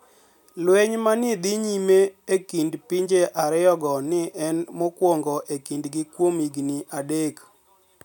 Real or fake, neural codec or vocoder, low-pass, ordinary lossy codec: real; none; none; none